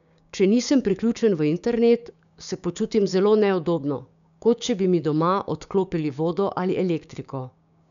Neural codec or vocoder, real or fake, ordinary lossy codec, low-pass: codec, 16 kHz, 6 kbps, DAC; fake; none; 7.2 kHz